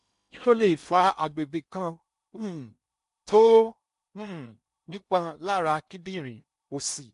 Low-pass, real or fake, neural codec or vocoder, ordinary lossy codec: 10.8 kHz; fake; codec, 16 kHz in and 24 kHz out, 0.8 kbps, FocalCodec, streaming, 65536 codes; MP3, 64 kbps